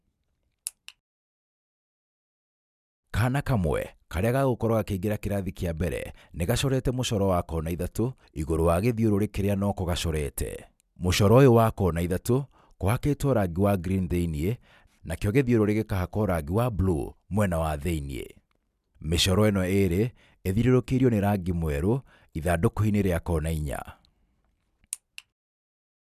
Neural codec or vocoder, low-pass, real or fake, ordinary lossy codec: none; 14.4 kHz; real; none